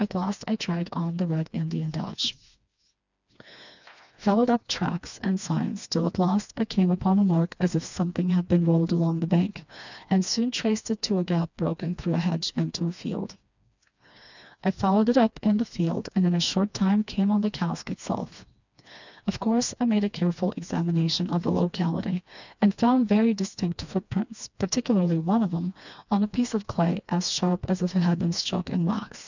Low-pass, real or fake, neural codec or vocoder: 7.2 kHz; fake; codec, 16 kHz, 2 kbps, FreqCodec, smaller model